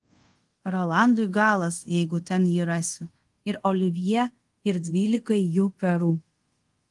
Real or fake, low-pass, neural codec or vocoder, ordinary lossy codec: fake; 10.8 kHz; codec, 24 kHz, 0.5 kbps, DualCodec; Opus, 24 kbps